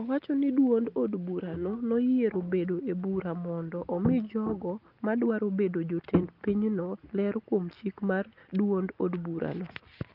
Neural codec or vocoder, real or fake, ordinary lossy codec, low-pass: none; real; Opus, 32 kbps; 5.4 kHz